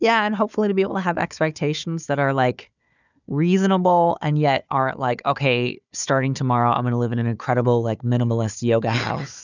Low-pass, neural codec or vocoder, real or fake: 7.2 kHz; codec, 16 kHz, 4 kbps, FunCodec, trained on Chinese and English, 50 frames a second; fake